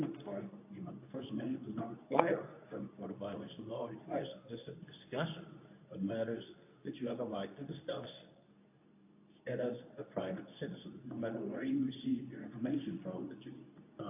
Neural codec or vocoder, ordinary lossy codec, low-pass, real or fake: codec, 24 kHz, 0.9 kbps, WavTokenizer, medium speech release version 2; AAC, 32 kbps; 3.6 kHz; fake